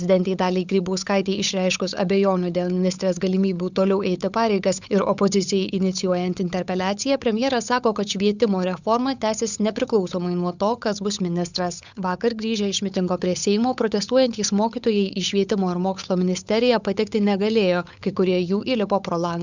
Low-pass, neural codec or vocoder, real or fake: 7.2 kHz; codec, 16 kHz, 8 kbps, FunCodec, trained on Chinese and English, 25 frames a second; fake